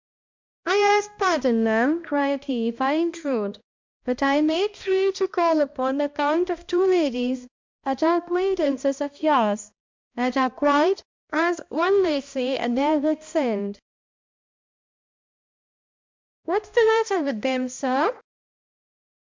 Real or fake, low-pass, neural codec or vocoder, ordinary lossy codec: fake; 7.2 kHz; codec, 16 kHz, 1 kbps, X-Codec, HuBERT features, trained on balanced general audio; MP3, 64 kbps